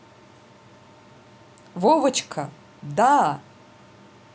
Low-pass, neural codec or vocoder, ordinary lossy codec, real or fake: none; none; none; real